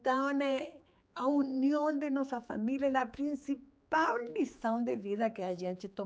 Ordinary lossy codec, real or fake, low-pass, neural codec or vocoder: none; fake; none; codec, 16 kHz, 4 kbps, X-Codec, HuBERT features, trained on general audio